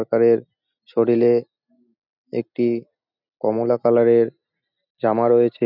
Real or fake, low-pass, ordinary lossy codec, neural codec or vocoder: real; 5.4 kHz; none; none